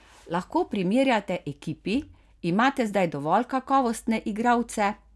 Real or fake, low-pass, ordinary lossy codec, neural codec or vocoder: real; none; none; none